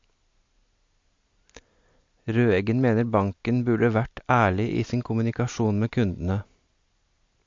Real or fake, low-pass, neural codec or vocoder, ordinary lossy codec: real; 7.2 kHz; none; MP3, 48 kbps